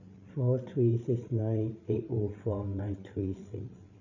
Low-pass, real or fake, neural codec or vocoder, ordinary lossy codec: 7.2 kHz; fake; codec, 16 kHz, 8 kbps, FreqCodec, larger model; none